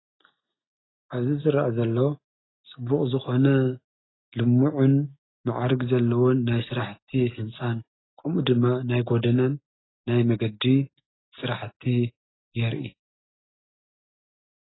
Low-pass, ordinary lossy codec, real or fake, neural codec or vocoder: 7.2 kHz; AAC, 16 kbps; real; none